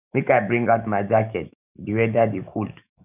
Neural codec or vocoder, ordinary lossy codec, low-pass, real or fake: autoencoder, 48 kHz, 128 numbers a frame, DAC-VAE, trained on Japanese speech; none; 3.6 kHz; fake